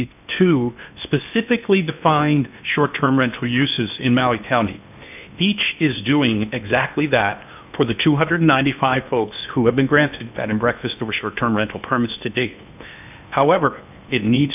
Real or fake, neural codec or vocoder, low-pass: fake; codec, 16 kHz in and 24 kHz out, 0.8 kbps, FocalCodec, streaming, 65536 codes; 3.6 kHz